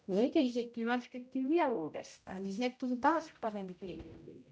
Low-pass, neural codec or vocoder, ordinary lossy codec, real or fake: none; codec, 16 kHz, 0.5 kbps, X-Codec, HuBERT features, trained on general audio; none; fake